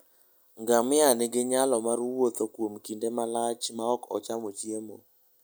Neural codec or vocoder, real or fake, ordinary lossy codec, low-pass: none; real; none; none